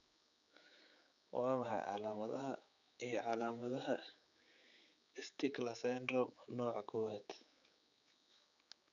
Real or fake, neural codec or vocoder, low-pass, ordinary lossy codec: fake; codec, 16 kHz, 4 kbps, X-Codec, HuBERT features, trained on general audio; 7.2 kHz; none